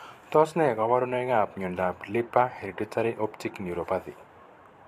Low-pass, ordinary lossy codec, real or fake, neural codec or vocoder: 14.4 kHz; AAC, 64 kbps; fake; vocoder, 44.1 kHz, 128 mel bands every 512 samples, BigVGAN v2